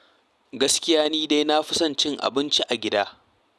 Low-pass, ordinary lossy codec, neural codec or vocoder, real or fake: none; none; none; real